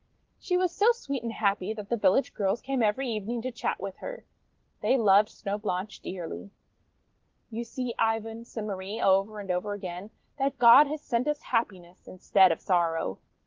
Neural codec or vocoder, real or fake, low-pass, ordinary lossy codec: none; real; 7.2 kHz; Opus, 16 kbps